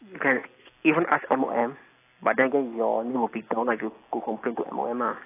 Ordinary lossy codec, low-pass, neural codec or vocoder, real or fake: AAC, 24 kbps; 3.6 kHz; none; real